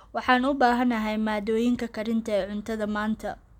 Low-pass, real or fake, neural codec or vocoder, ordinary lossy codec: 19.8 kHz; real; none; none